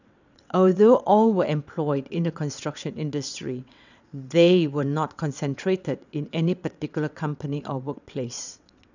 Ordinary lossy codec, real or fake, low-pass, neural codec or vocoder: none; fake; 7.2 kHz; vocoder, 22.05 kHz, 80 mel bands, WaveNeXt